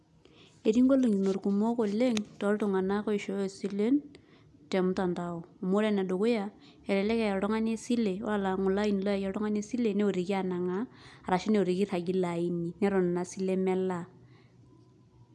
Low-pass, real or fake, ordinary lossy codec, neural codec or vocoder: none; real; none; none